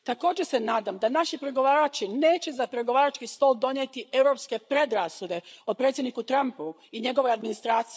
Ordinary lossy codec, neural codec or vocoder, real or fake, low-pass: none; codec, 16 kHz, 8 kbps, FreqCodec, larger model; fake; none